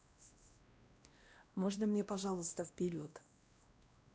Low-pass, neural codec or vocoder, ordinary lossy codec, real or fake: none; codec, 16 kHz, 1 kbps, X-Codec, WavLM features, trained on Multilingual LibriSpeech; none; fake